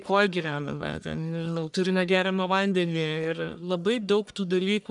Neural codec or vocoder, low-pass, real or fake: codec, 44.1 kHz, 1.7 kbps, Pupu-Codec; 10.8 kHz; fake